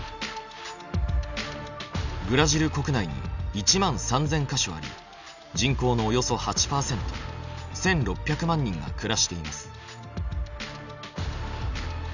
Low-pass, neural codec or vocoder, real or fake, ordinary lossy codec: 7.2 kHz; none; real; none